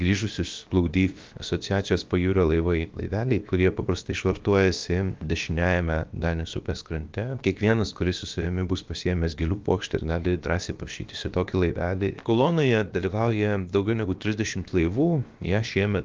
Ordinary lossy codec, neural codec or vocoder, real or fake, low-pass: Opus, 24 kbps; codec, 16 kHz, about 1 kbps, DyCAST, with the encoder's durations; fake; 7.2 kHz